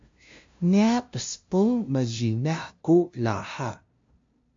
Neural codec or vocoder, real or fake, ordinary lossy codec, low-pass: codec, 16 kHz, 0.5 kbps, FunCodec, trained on LibriTTS, 25 frames a second; fake; MP3, 48 kbps; 7.2 kHz